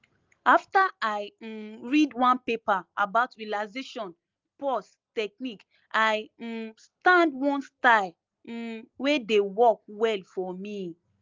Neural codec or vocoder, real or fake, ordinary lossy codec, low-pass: none; real; Opus, 24 kbps; 7.2 kHz